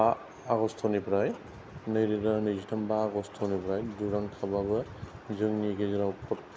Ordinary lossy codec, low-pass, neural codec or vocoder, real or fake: none; none; none; real